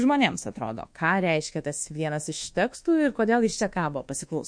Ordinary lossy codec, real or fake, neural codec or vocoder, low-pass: MP3, 48 kbps; fake; codec, 24 kHz, 1.2 kbps, DualCodec; 9.9 kHz